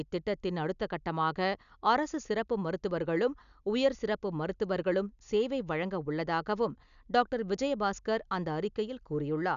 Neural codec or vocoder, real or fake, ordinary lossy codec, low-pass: none; real; none; 7.2 kHz